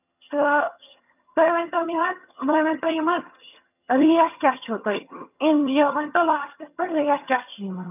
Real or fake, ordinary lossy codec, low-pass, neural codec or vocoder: fake; none; 3.6 kHz; vocoder, 22.05 kHz, 80 mel bands, HiFi-GAN